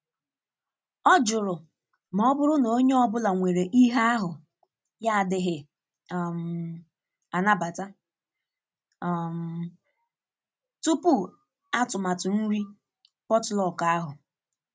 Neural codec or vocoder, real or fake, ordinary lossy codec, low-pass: none; real; none; none